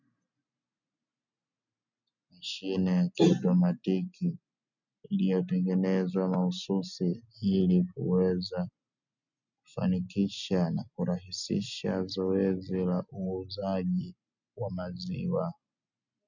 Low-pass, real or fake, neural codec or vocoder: 7.2 kHz; real; none